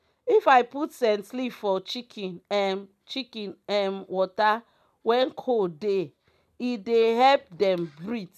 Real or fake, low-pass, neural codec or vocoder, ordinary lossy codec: real; 14.4 kHz; none; none